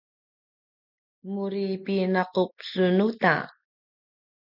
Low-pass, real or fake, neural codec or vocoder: 5.4 kHz; real; none